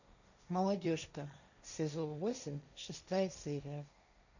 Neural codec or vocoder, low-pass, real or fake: codec, 16 kHz, 1.1 kbps, Voila-Tokenizer; 7.2 kHz; fake